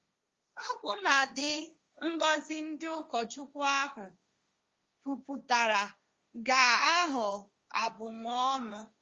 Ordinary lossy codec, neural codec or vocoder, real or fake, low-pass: Opus, 32 kbps; codec, 16 kHz, 1.1 kbps, Voila-Tokenizer; fake; 7.2 kHz